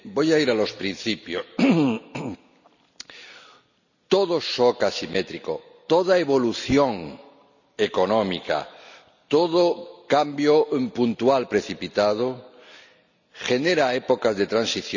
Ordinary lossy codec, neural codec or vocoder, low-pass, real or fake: none; none; 7.2 kHz; real